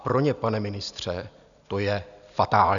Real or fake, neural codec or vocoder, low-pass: real; none; 7.2 kHz